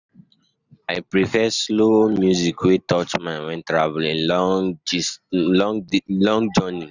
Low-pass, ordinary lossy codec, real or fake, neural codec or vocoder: 7.2 kHz; none; real; none